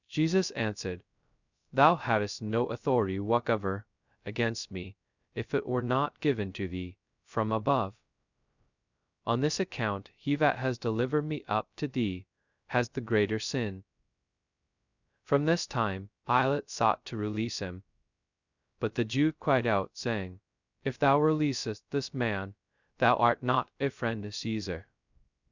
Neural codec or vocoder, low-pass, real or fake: codec, 16 kHz, 0.2 kbps, FocalCodec; 7.2 kHz; fake